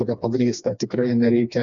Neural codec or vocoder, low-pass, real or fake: codec, 16 kHz, 2 kbps, FreqCodec, smaller model; 7.2 kHz; fake